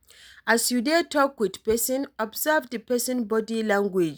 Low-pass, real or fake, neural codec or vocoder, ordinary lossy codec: none; real; none; none